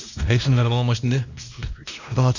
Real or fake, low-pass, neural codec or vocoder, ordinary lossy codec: fake; 7.2 kHz; codec, 16 kHz, 1 kbps, X-Codec, WavLM features, trained on Multilingual LibriSpeech; none